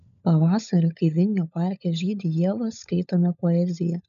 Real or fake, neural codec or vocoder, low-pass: fake; codec, 16 kHz, 16 kbps, FunCodec, trained on LibriTTS, 50 frames a second; 7.2 kHz